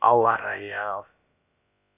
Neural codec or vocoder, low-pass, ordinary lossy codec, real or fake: codec, 16 kHz, about 1 kbps, DyCAST, with the encoder's durations; 3.6 kHz; none; fake